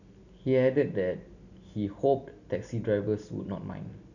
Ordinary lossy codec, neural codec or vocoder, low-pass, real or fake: none; none; 7.2 kHz; real